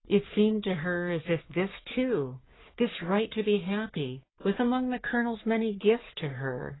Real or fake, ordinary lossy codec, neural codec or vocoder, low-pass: fake; AAC, 16 kbps; codec, 44.1 kHz, 3.4 kbps, Pupu-Codec; 7.2 kHz